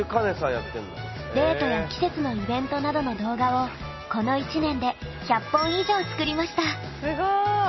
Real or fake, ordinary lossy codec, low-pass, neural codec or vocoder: real; MP3, 24 kbps; 7.2 kHz; none